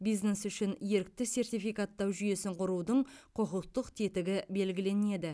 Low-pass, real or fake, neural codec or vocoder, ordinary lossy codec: none; real; none; none